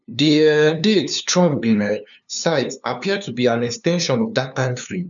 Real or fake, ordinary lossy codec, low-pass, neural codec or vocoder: fake; none; 7.2 kHz; codec, 16 kHz, 2 kbps, FunCodec, trained on LibriTTS, 25 frames a second